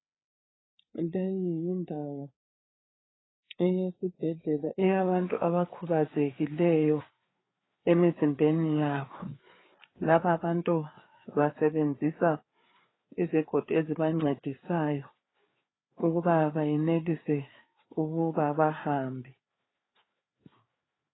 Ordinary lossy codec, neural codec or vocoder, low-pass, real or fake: AAC, 16 kbps; codec, 16 kHz, 4 kbps, FreqCodec, larger model; 7.2 kHz; fake